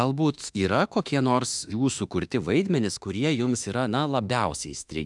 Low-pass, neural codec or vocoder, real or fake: 10.8 kHz; autoencoder, 48 kHz, 32 numbers a frame, DAC-VAE, trained on Japanese speech; fake